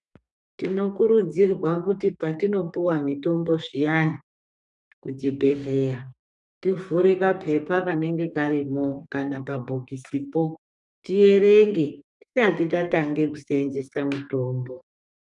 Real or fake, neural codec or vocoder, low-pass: fake; codec, 32 kHz, 1.9 kbps, SNAC; 10.8 kHz